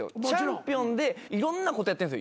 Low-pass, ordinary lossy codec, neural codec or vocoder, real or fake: none; none; none; real